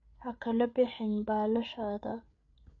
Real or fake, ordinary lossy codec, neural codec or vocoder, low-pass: fake; none; codec, 16 kHz, 8 kbps, FreqCodec, larger model; 7.2 kHz